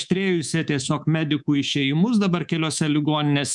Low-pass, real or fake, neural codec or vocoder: 10.8 kHz; fake; codec, 24 kHz, 3.1 kbps, DualCodec